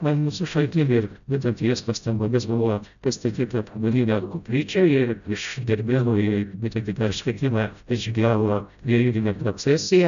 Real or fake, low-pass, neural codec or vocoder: fake; 7.2 kHz; codec, 16 kHz, 0.5 kbps, FreqCodec, smaller model